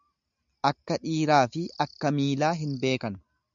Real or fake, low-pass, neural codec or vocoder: real; 7.2 kHz; none